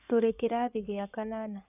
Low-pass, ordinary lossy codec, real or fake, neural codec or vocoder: 3.6 kHz; none; fake; codec, 16 kHz in and 24 kHz out, 2.2 kbps, FireRedTTS-2 codec